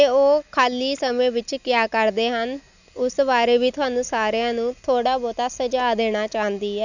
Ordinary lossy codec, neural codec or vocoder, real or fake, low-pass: none; none; real; 7.2 kHz